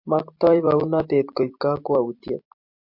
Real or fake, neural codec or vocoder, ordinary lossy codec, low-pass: fake; vocoder, 44.1 kHz, 128 mel bands every 256 samples, BigVGAN v2; MP3, 48 kbps; 5.4 kHz